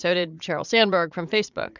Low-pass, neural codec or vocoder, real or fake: 7.2 kHz; vocoder, 44.1 kHz, 80 mel bands, Vocos; fake